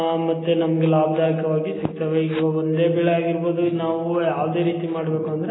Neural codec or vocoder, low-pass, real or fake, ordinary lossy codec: none; 7.2 kHz; real; AAC, 16 kbps